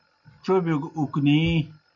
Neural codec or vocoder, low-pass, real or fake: none; 7.2 kHz; real